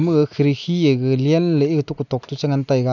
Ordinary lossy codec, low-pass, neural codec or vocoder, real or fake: none; 7.2 kHz; none; real